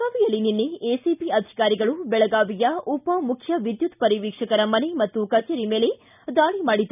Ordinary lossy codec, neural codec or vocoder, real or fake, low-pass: none; none; real; 3.6 kHz